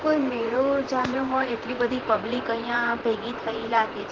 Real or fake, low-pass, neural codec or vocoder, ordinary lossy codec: fake; 7.2 kHz; vocoder, 44.1 kHz, 128 mel bands, Pupu-Vocoder; Opus, 16 kbps